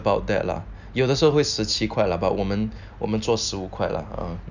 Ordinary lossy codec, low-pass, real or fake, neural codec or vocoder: none; 7.2 kHz; real; none